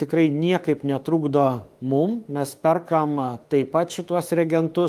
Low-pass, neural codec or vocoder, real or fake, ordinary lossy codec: 14.4 kHz; autoencoder, 48 kHz, 32 numbers a frame, DAC-VAE, trained on Japanese speech; fake; Opus, 32 kbps